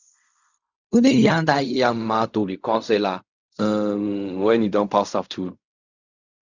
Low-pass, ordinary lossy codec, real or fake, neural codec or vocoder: 7.2 kHz; Opus, 64 kbps; fake; codec, 16 kHz in and 24 kHz out, 0.4 kbps, LongCat-Audio-Codec, fine tuned four codebook decoder